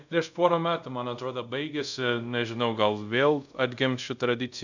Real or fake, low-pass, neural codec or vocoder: fake; 7.2 kHz; codec, 24 kHz, 0.5 kbps, DualCodec